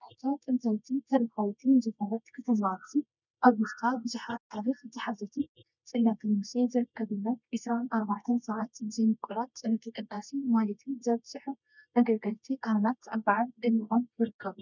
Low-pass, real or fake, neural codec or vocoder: 7.2 kHz; fake; codec, 24 kHz, 0.9 kbps, WavTokenizer, medium music audio release